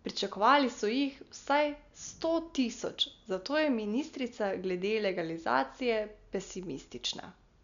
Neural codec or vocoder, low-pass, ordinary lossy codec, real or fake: none; 7.2 kHz; none; real